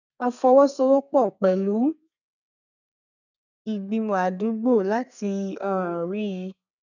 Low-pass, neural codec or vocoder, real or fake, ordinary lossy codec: 7.2 kHz; codec, 32 kHz, 1.9 kbps, SNAC; fake; none